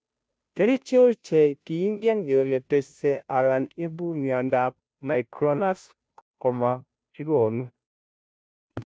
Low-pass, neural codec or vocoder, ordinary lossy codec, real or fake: none; codec, 16 kHz, 0.5 kbps, FunCodec, trained on Chinese and English, 25 frames a second; none; fake